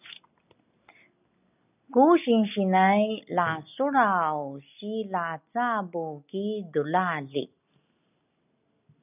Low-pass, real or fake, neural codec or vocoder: 3.6 kHz; real; none